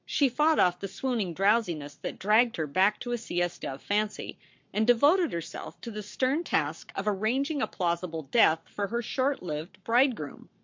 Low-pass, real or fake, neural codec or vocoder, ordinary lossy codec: 7.2 kHz; fake; codec, 44.1 kHz, 7.8 kbps, Pupu-Codec; MP3, 48 kbps